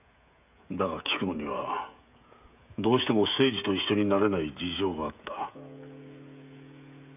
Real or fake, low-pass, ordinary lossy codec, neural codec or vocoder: fake; 3.6 kHz; none; codec, 16 kHz, 16 kbps, FreqCodec, smaller model